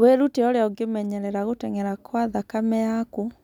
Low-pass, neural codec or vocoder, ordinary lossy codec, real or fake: 19.8 kHz; none; none; real